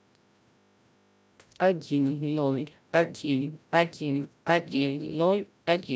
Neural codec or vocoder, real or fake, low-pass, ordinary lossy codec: codec, 16 kHz, 0.5 kbps, FreqCodec, larger model; fake; none; none